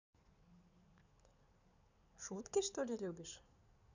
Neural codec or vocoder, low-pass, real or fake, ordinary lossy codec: codec, 16 kHz in and 24 kHz out, 2.2 kbps, FireRedTTS-2 codec; 7.2 kHz; fake; none